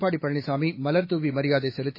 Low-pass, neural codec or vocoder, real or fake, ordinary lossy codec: 5.4 kHz; autoencoder, 48 kHz, 128 numbers a frame, DAC-VAE, trained on Japanese speech; fake; MP3, 24 kbps